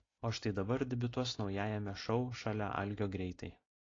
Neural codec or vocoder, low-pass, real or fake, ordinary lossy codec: none; 7.2 kHz; real; AAC, 32 kbps